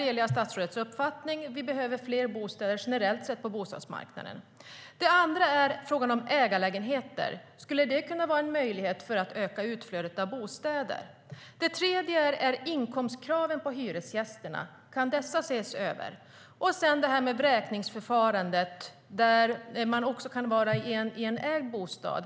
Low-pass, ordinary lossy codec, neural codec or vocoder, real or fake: none; none; none; real